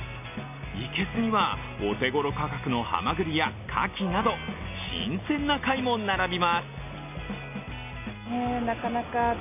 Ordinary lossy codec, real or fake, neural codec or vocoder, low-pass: none; real; none; 3.6 kHz